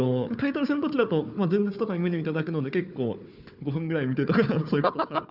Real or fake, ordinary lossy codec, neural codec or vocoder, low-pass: fake; none; codec, 16 kHz, 4 kbps, FunCodec, trained on Chinese and English, 50 frames a second; 5.4 kHz